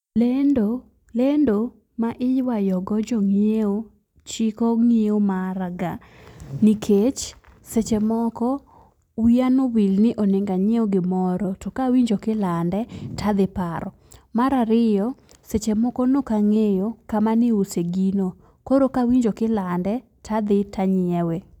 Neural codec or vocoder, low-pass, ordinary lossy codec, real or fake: none; 19.8 kHz; none; real